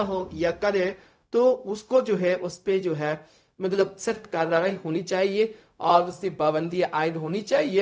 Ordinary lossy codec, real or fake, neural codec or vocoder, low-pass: none; fake; codec, 16 kHz, 0.4 kbps, LongCat-Audio-Codec; none